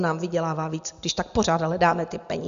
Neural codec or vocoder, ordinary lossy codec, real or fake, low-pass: none; Opus, 64 kbps; real; 7.2 kHz